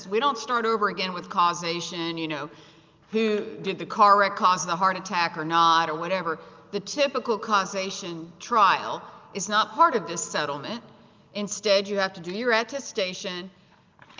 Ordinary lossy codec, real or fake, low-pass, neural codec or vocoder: Opus, 24 kbps; real; 7.2 kHz; none